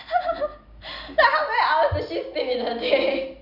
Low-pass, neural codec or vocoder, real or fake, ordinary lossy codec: 5.4 kHz; none; real; none